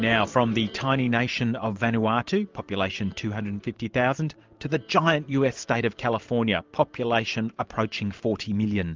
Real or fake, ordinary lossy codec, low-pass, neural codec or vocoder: fake; Opus, 32 kbps; 7.2 kHz; vocoder, 44.1 kHz, 128 mel bands every 512 samples, BigVGAN v2